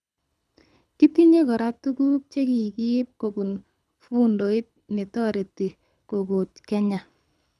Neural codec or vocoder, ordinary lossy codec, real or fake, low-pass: codec, 24 kHz, 6 kbps, HILCodec; none; fake; none